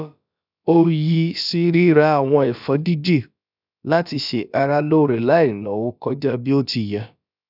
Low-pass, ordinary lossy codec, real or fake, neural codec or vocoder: 5.4 kHz; none; fake; codec, 16 kHz, about 1 kbps, DyCAST, with the encoder's durations